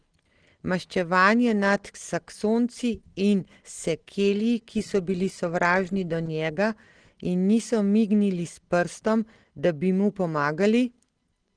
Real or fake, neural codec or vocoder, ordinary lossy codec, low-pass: real; none; Opus, 16 kbps; 9.9 kHz